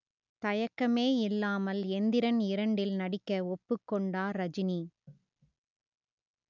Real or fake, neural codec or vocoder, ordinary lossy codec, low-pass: real; none; none; 7.2 kHz